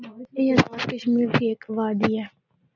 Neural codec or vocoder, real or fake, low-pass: none; real; 7.2 kHz